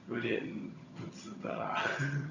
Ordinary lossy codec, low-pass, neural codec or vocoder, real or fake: none; 7.2 kHz; vocoder, 22.05 kHz, 80 mel bands, HiFi-GAN; fake